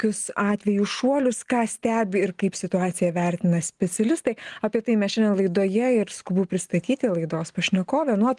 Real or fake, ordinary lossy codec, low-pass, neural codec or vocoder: real; Opus, 24 kbps; 10.8 kHz; none